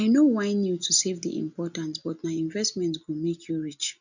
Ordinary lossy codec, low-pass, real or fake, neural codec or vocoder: none; 7.2 kHz; real; none